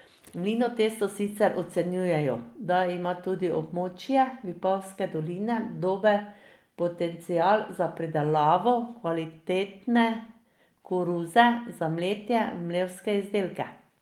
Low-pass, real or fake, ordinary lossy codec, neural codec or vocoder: 19.8 kHz; real; Opus, 24 kbps; none